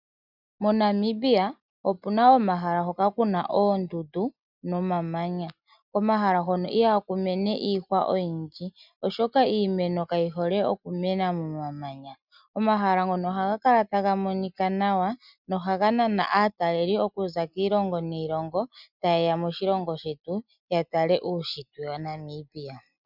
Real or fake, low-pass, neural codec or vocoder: real; 5.4 kHz; none